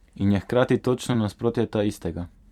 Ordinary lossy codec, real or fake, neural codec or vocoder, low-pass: Opus, 64 kbps; fake; vocoder, 44.1 kHz, 128 mel bands every 256 samples, BigVGAN v2; 19.8 kHz